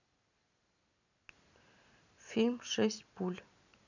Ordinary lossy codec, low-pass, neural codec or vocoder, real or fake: none; 7.2 kHz; none; real